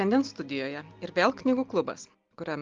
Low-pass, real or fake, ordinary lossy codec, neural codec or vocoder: 7.2 kHz; real; Opus, 32 kbps; none